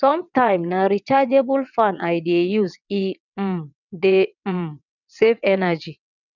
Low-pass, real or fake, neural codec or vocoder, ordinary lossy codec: 7.2 kHz; fake; vocoder, 22.05 kHz, 80 mel bands, WaveNeXt; none